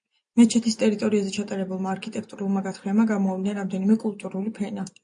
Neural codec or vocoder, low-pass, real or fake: none; 9.9 kHz; real